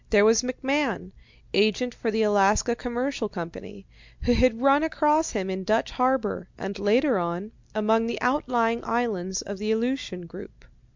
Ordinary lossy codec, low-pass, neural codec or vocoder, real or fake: MP3, 64 kbps; 7.2 kHz; none; real